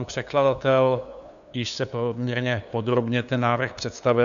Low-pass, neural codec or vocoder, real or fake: 7.2 kHz; codec, 16 kHz, 2 kbps, FunCodec, trained on LibriTTS, 25 frames a second; fake